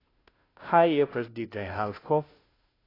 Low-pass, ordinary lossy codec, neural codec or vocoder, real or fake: 5.4 kHz; AAC, 24 kbps; codec, 16 kHz, 0.5 kbps, FunCodec, trained on Chinese and English, 25 frames a second; fake